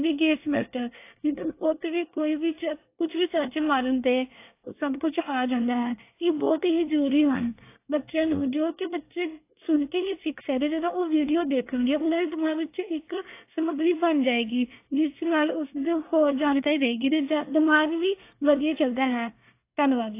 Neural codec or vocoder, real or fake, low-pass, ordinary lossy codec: codec, 24 kHz, 1 kbps, SNAC; fake; 3.6 kHz; AAC, 24 kbps